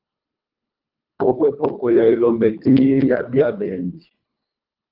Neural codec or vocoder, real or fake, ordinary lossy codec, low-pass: codec, 24 kHz, 1.5 kbps, HILCodec; fake; Opus, 24 kbps; 5.4 kHz